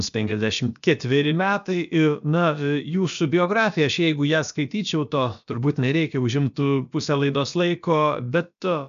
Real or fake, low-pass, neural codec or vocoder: fake; 7.2 kHz; codec, 16 kHz, about 1 kbps, DyCAST, with the encoder's durations